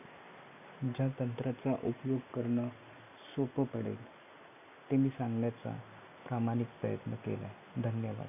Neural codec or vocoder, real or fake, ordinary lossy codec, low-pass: none; real; MP3, 32 kbps; 3.6 kHz